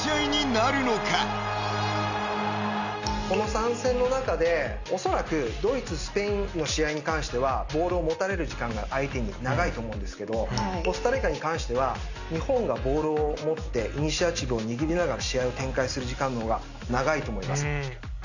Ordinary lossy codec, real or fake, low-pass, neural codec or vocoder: none; real; 7.2 kHz; none